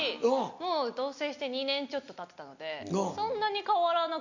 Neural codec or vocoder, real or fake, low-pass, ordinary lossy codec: none; real; 7.2 kHz; none